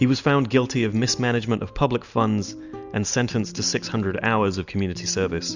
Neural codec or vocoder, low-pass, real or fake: none; 7.2 kHz; real